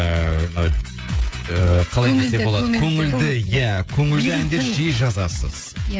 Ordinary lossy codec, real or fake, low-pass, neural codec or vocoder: none; fake; none; codec, 16 kHz, 16 kbps, FreqCodec, smaller model